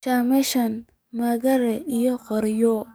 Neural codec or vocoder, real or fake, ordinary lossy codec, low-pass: codec, 44.1 kHz, 7.8 kbps, DAC; fake; none; none